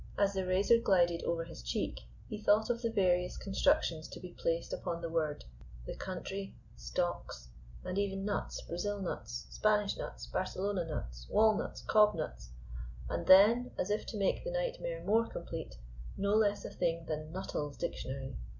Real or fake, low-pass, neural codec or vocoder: real; 7.2 kHz; none